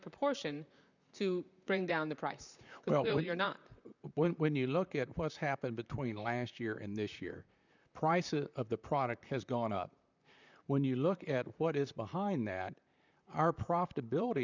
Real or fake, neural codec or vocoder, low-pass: fake; vocoder, 44.1 kHz, 128 mel bands, Pupu-Vocoder; 7.2 kHz